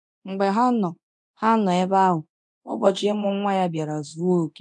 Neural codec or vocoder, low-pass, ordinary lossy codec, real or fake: codec, 24 kHz, 0.9 kbps, DualCodec; 10.8 kHz; none; fake